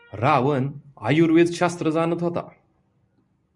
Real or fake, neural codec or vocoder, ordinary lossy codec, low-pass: real; none; MP3, 96 kbps; 10.8 kHz